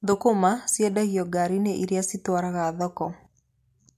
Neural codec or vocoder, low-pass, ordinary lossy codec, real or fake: none; 14.4 kHz; MP3, 64 kbps; real